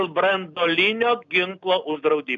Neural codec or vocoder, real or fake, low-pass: none; real; 7.2 kHz